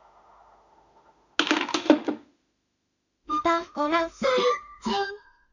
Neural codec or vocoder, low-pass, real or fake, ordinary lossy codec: autoencoder, 48 kHz, 32 numbers a frame, DAC-VAE, trained on Japanese speech; 7.2 kHz; fake; none